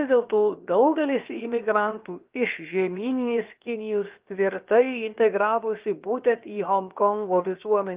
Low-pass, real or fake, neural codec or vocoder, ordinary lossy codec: 3.6 kHz; fake; codec, 16 kHz, 0.7 kbps, FocalCodec; Opus, 32 kbps